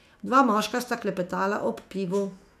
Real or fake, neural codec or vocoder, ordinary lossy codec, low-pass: fake; autoencoder, 48 kHz, 128 numbers a frame, DAC-VAE, trained on Japanese speech; none; 14.4 kHz